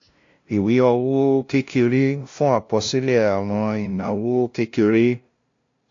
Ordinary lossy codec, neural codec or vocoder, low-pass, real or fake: AAC, 48 kbps; codec, 16 kHz, 0.5 kbps, FunCodec, trained on LibriTTS, 25 frames a second; 7.2 kHz; fake